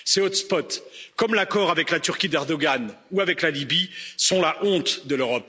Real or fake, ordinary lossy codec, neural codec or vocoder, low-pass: real; none; none; none